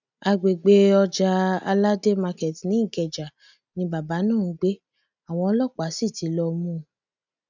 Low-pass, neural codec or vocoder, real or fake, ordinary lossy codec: 7.2 kHz; none; real; none